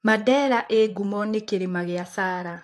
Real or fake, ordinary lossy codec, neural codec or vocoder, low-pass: fake; AAC, 64 kbps; vocoder, 44.1 kHz, 128 mel bands, Pupu-Vocoder; 14.4 kHz